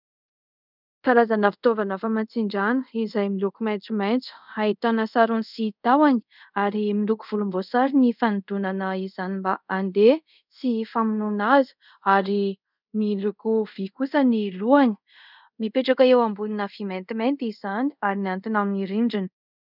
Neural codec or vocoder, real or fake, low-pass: codec, 24 kHz, 0.5 kbps, DualCodec; fake; 5.4 kHz